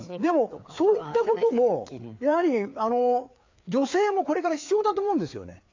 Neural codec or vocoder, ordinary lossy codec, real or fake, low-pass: codec, 16 kHz, 4 kbps, FreqCodec, larger model; AAC, 48 kbps; fake; 7.2 kHz